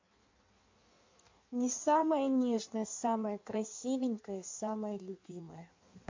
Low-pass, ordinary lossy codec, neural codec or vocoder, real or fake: 7.2 kHz; MP3, 48 kbps; codec, 16 kHz in and 24 kHz out, 1.1 kbps, FireRedTTS-2 codec; fake